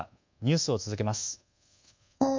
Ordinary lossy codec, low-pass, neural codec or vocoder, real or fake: MP3, 64 kbps; 7.2 kHz; codec, 24 kHz, 1.2 kbps, DualCodec; fake